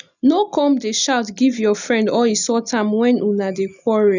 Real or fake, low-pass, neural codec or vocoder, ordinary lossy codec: real; 7.2 kHz; none; none